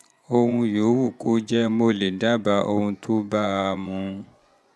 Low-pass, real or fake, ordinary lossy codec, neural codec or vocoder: none; fake; none; vocoder, 24 kHz, 100 mel bands, Vocos